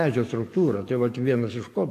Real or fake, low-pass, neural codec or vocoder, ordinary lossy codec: fake; 14.4 kHz; codec, 44.1 kHz, 7.8 kbps, DAC; AAC, 64 kbps